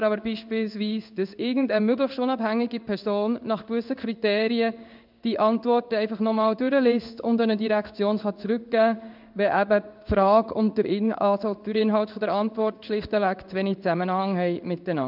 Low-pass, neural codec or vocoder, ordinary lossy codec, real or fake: 5.4 kHz; codec, 16 kHz in and 24 kHz out, 1 kbps, XY-Tokenizer; none; fake